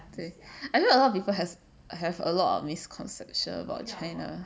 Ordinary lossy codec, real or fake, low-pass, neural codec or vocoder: none; real; none; none